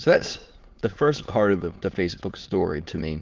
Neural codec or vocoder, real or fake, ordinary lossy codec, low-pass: autoencoder, 22.05 kHz, a latent of 192 numbers a frame, VITS, trained on many speakers; fake; Opus, 16 kbps; 7.2 kHz